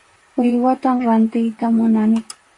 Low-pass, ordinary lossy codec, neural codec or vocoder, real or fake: 10.8 kHz; MP3, 64 kbps; vocoder, 44.1 kHz, 128 mel bands, Pupu-Vocoder; fake